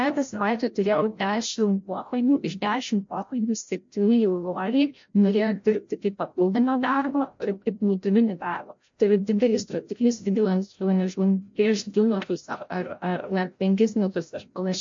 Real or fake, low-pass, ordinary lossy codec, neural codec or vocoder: fake; 7.2 kHz; MP3, 48 kbps; codec, 16 kHz, 0.5 kbps, FreqCodec, larger model